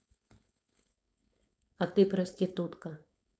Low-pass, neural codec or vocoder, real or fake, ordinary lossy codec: none; codec, 16 kHz, 4.8 kbps, FACodec; fake; none